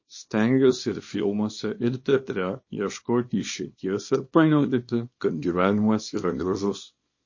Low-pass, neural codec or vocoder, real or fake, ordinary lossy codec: 7.2 kHz; codec, 24 kHz, 0.9 kbps, WavTokenizer, small release; fake; MP3, 32 kbps